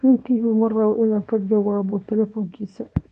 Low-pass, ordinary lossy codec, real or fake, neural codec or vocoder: 10.8 kHz; none; fake; codec, 24 kHz, 0.9 kbps, WavTokenizer, small release